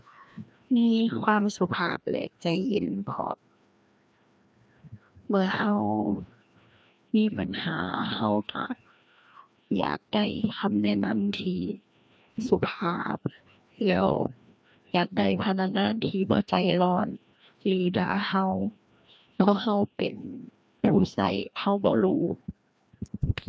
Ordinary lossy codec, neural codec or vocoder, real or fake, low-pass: none; codec, 16 kHz, 1 kbps, FreqCodec, larger model; fake; none